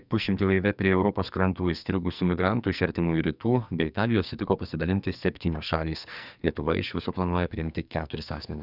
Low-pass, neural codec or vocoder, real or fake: 5.4 kHz; codec, 44.1 kHz, 2.6 kbps, SNAC; fake